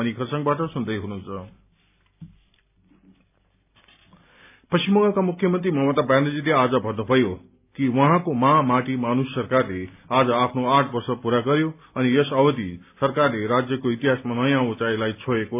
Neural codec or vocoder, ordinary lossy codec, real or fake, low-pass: none; AAC, 32 kbps; real; 3.6 kHz